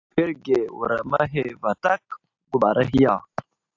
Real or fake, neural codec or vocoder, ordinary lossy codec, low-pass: real; none; AAC, 48 kbps; 7.2 kHz